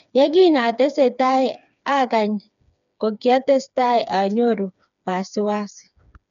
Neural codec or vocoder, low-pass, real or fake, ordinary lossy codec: codec, 16 kHz, 4 kbps, FreqCodec, smaller model; 7.2 kHz; fake; none